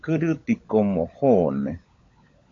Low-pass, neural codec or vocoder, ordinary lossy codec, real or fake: 7.2 kHz; codec, 16 kHz, 16 kbps, FunCodec, trained on LibriTTS, 50 frames a second; AAC, 48 kbps; fake